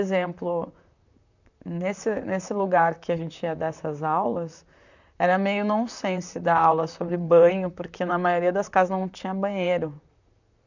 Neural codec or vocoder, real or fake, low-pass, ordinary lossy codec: vocoder, 44.1 kHz, 128 mel bands, Pupu-Vocoder; fake; 7.2 kHz; none